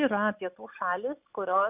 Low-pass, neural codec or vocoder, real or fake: 3.6 kHz; none; real